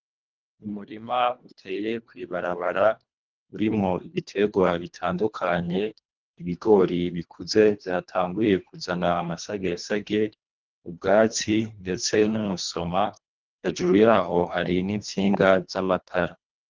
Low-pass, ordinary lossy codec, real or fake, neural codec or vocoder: 7.2 kHz; Opus, 24 kbps; fake; codec, 24 kHz, 1.5 kbps, HILCodec